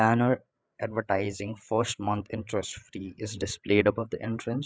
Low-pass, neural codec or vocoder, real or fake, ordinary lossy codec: none; codec, 16 kHz, 8 kbps, FreqCodec, larger model; fake; none